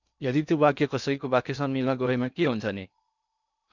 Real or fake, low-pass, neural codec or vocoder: fake; 7.2 kHz; codec, 16 kHz in and 24 kHz out, 0.8 kbps, FocalCodec, streaming, 65536 codes